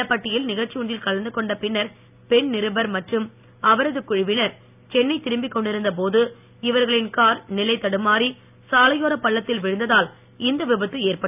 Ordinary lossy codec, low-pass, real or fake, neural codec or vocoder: none; 3.6 kHz; real; none